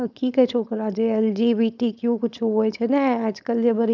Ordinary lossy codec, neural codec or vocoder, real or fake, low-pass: none; codec, 16 kHz, 4.8 kbps, FACodec; fake; 7.2 kHz